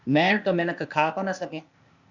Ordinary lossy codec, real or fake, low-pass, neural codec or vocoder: Opus, 64 kbps; fake; 7.2 kHz; codec, 16 kHz, 0.8 kbps, ZipCodec